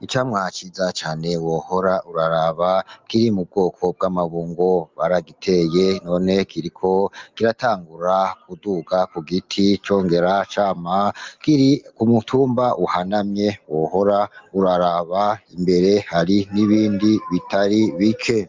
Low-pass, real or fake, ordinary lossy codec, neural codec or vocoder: 7.2 kHz; real; Opus, 24 kbps; none